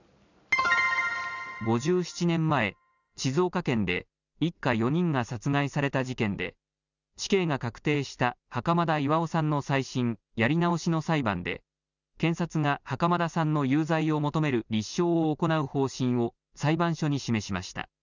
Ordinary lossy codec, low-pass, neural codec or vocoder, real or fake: none; 7.2 kHz; none; real